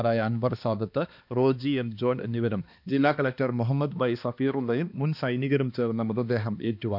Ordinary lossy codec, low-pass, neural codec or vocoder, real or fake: none; 5.4 kHz; codec, 16 kHz, 2 kbps, X-Codec, HuBERT features, trained on balanced general audio; fake